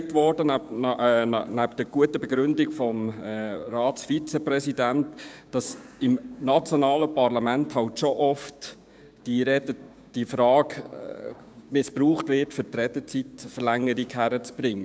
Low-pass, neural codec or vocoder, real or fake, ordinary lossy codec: none; codec, 16 kHz, 6 kbps, DAC; fake; none